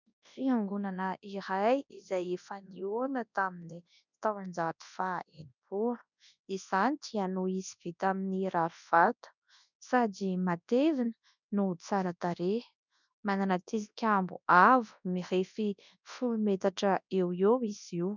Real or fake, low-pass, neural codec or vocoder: fake; 7.2 kHz; codec, 24 kHz, 0.9 kbps, WavTokenizer, large speech release